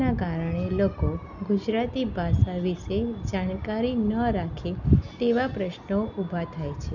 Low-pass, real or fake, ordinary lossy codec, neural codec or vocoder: 7.2 kHz; real; none; none